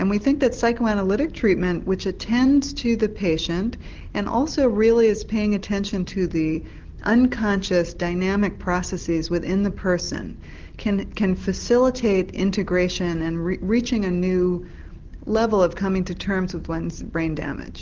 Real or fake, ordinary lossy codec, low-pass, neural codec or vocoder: real; Opus, 16 kbps; 7.2 kHz; none